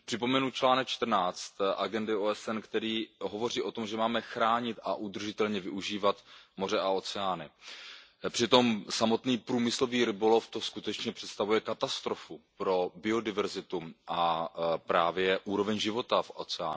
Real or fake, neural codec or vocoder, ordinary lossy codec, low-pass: real; none; none; none